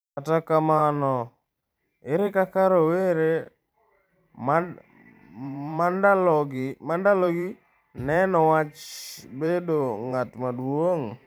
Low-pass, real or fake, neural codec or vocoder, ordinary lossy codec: none; fake; vocoder, 44.1 kHz, 128 mel bands every 512 samples, BigVGAN v2; none